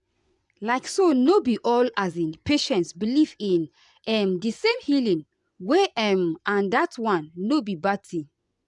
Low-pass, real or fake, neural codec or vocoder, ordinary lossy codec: 10.8 kHz; fake; vocoder, 24 kHz, 100 mel bands, Vocos; none